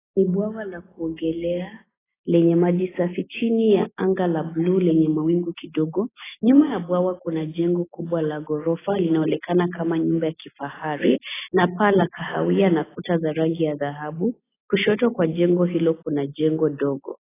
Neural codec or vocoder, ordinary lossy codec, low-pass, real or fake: none; AAC, 16 kbps; 3.6 kHz; real